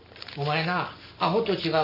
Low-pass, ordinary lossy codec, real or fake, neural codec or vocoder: 5.4 kHz; none; real; none